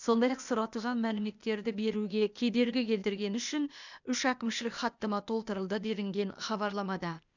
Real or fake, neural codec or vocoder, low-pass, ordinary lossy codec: fake; codec, 16 kHz, 0.8 kbps, ZipCodec; 7.2 kHz; none